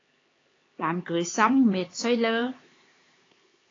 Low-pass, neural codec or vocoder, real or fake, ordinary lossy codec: 7.2 kHz; codec, 16 kHz, 4 kbps, X-Codec, HuBERT features, trained on LibriSpeech; fake; AAC, 32 kbps